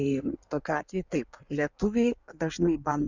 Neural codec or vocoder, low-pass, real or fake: codec, 16 kHz in and 24 kHz out, 1.1 kbps, FireRedTTS-2 codec; 7.2 kHz; fake